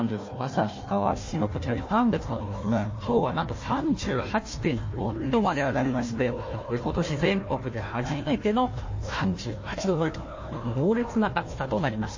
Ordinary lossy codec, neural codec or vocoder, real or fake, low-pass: MP3, 32 kbps; codec, 16 kHz, 1 kbps, FunCodec, trained on Chinese and English, 50 frames a second; fake; 7.2 kHz